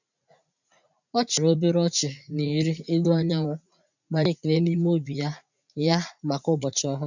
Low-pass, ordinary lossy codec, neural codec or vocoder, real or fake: 7.2 kHz; none; vocoder, 44.1 kHz, 80 mel bands, Vocos; fake